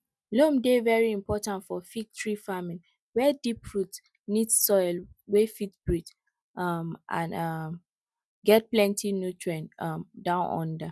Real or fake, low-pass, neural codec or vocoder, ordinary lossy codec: real; none; none; none